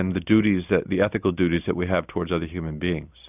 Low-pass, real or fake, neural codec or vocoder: 3.6 kHz; real; none